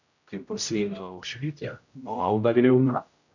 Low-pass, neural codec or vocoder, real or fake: 7.2 kHz; codec, 16 kHz, 0.5 kbps, X-Codec, HuBERT features, trained on general audio; fake